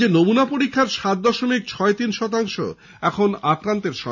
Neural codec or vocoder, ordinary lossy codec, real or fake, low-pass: none; none; real; 7.2 kHz